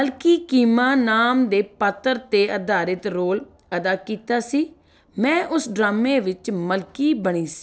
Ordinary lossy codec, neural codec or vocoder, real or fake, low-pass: none; none; real; none